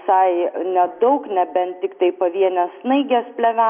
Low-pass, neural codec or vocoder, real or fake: 3.6 kHz; none; real